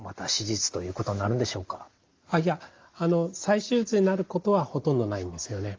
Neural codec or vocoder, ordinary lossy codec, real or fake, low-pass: none; Opus, 32 kbps; real; 7.2 kHz